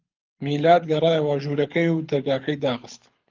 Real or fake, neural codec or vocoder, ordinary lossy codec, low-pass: fake; codec, 24 kHz, 6 kbps, HILCodec; Opus, 24 kbps; 7.2 kHz